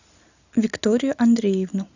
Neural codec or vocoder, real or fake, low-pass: none; real; 7.2 kHz